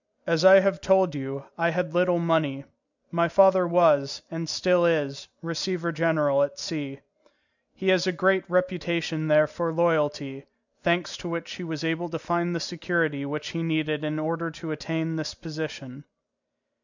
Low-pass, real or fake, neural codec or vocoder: 7.2 kHz; real; none